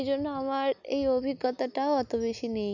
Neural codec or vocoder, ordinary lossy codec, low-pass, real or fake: none; none; 7.2 kHz; real